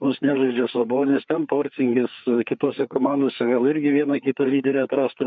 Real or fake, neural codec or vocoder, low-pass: fake; codec, 16 kHz, 4 kbps, FreqCodec, larger model; 7.2 kHz